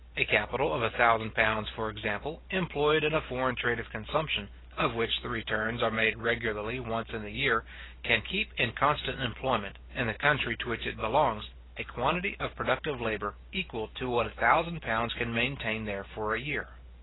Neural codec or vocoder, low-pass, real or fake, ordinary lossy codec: none; 7.2 kHz; real; AAC, 16 kbps